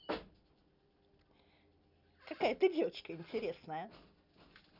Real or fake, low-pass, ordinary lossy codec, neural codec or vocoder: real; 5.4 kHz; none; none